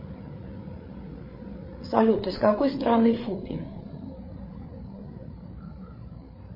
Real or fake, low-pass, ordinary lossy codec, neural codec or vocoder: fake; 5.4 kHz; MP3, 24 kbps; codec, 16 kHz, 8 kbps, FreqCodec, larger model